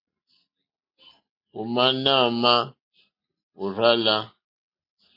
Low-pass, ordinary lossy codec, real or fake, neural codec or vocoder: 5.4 kHz; MP3, 32 kbps; real; none